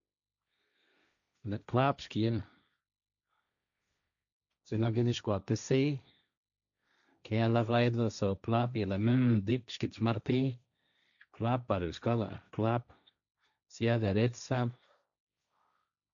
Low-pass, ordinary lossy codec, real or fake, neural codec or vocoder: 7.2 kHz; none; fake; codec, 16 kHz, 1.1 kbps, Voila-Tokenizer